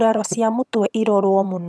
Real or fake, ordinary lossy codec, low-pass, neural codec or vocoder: fake; none; none; vocoder, 22.05 kHz, 80 mel bands, HiFi-GAN